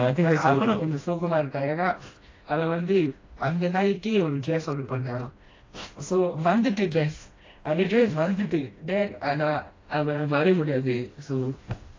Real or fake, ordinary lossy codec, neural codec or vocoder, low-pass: fake; AAC, 32 kbps; codec, 16 kHz, 1 kbps, FreqCodec, smaller model; 7.2 kHz